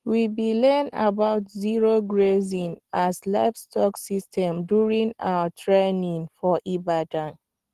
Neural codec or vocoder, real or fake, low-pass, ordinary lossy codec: none; real; 14.4 kHz; Opus, 16 kbps